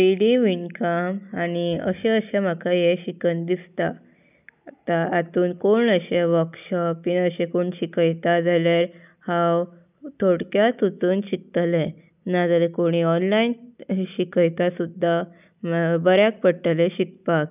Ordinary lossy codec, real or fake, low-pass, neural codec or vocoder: none; real; 3.6 kHz; none